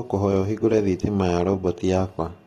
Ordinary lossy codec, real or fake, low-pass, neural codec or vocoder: AAC, 32 kbps; real; 19.8 kHz; none